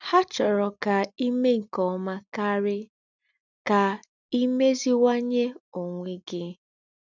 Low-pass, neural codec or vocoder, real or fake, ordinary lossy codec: 7.2 kHz; none; real; none